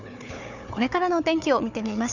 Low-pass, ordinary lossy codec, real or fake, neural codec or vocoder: 7.2 kHz; none; fake; codec, 16 kHz, 4 kbps, FunCodec, trained on Chinese and English, 50 frames a second